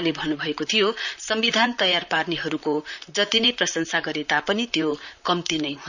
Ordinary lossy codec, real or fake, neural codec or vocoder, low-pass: none; fake; vocoder, 44.1 kHz, 128 mel bands, Pupu-Vocoder; 7.2 kHz